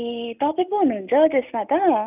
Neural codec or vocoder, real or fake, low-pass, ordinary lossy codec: none; real; 3.6 kHz; none